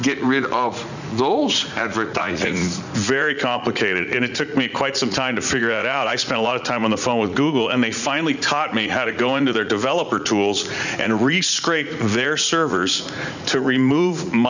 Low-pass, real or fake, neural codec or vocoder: 7.2 kHz; fake; vocoder, 44.1 kHz, 80 mel bands, Vocos